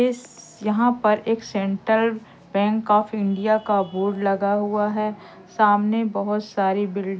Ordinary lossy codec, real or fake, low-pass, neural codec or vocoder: none; real; none; none